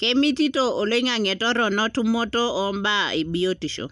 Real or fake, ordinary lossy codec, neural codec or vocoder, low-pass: real; none; none; 10.8 kHz